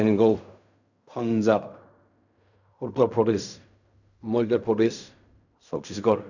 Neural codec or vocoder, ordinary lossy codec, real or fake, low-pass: codec, 16 kHz in and 24 kHz out, 0.4 kbps, LongCat-Audio-Codec, fine tuned four codebook decoder; none; fake; 7.2 kHz